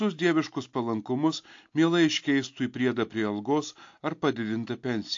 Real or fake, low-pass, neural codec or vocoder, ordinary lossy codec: real; 7.2 kHz; none; AAC, 48 kbps